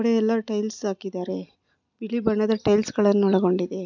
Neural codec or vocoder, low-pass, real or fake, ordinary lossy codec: none; 7.2 kHz; real; none